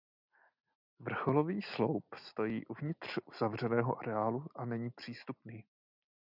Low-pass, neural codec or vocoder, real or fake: 5.4 kHz; none; real